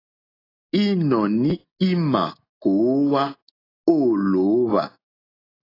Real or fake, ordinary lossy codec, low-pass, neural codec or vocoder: real; AAC, 24 kbps; 5.4 kHz; none